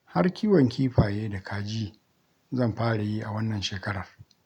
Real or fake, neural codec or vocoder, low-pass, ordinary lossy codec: real; none; 19.8 kHz; none